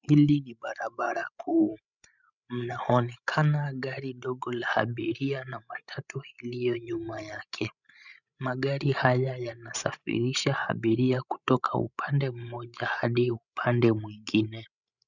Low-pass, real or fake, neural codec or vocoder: 7.2 kHz; fake; codec, 16 kHz, 16 kbps, FreqCodec, larger model